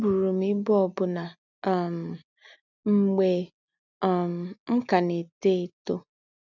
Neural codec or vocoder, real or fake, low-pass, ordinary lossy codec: none; real; 7.2 kHz; none